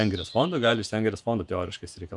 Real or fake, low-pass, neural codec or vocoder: fake; 10.8 kHz; vocoder, 48 kHz, 128 mel bands, Vocos